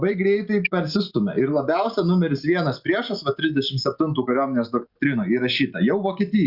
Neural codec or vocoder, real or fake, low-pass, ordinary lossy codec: none; real; 5.4 kHz; Opus, 64 kbps